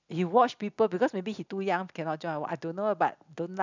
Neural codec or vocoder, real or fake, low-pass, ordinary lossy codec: none; real; 7.2 kHz; none